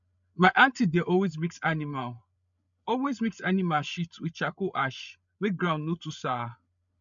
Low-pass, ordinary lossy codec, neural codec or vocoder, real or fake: 7.2 kHz; none; codec, 16 kHz, 8 kbps, FreqCodec, larger model; fake